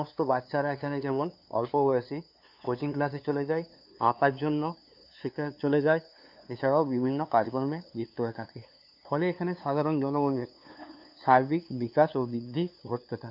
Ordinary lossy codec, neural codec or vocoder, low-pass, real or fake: none; codec, 16 kHz, 2 kbps, FunCodec, trained on LibriTTS, 25 frames a second; 5.4 kHz; fake